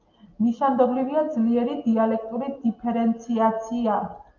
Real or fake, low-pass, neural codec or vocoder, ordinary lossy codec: real; 7.2 kHz; none; Opus, 24 kbps